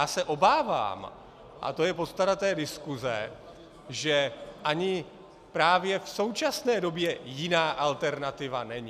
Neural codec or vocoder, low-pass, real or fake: none; 14.4 kHz; real